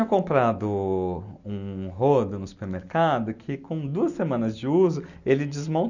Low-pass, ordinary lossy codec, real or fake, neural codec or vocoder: 7.2 kHz; none; real; none